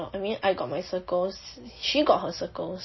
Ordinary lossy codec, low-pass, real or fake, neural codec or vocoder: MP3, 24 kbps; 7.2 kHz; real; none